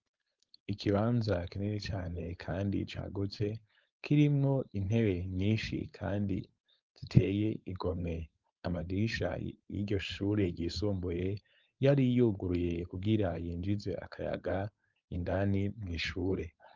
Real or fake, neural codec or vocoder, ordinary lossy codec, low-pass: fake; codec, 16 kHz, 4.8 kbps, FACodec; Opus, 32 kbps; 7.2 kHz